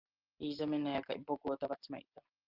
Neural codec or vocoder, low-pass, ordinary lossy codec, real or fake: none; 5.4 kHz; Opus, 24 kbps; real